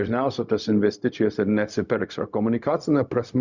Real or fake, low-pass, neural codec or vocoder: fake; 7.2 kHz; codec, 16 kHz, 0.4 kbps, LongCat-Audio-Codec